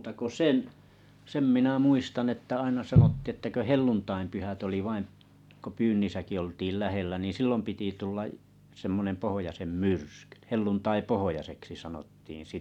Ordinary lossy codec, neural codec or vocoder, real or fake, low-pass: none; none; real; 19.8 kHz